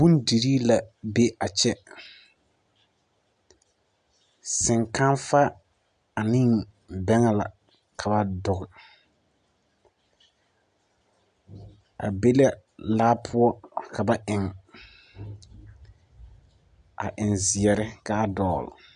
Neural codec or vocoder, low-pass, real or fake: none; 9.9 kHz; real